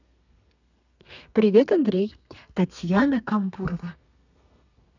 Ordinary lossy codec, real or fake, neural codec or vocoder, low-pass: none; fake; codec, 32 kHz, 1.9 kbps, SNAC; 7.2 kHz